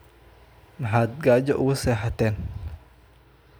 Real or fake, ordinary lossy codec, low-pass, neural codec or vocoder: real; none; none; none